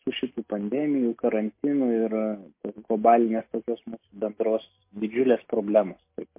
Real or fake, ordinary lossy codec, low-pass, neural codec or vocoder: real; MP3, 24 kbps; 3.6 kHz; none